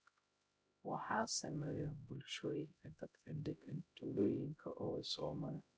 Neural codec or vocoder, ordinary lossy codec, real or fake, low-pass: codec, 16 kHz, 0.5 kbps, X-Codec, HuBERT features, trained on LibriSpeech; none; fake; none